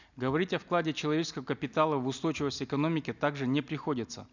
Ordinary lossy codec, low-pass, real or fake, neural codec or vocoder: none; 7.2 kHz; real; none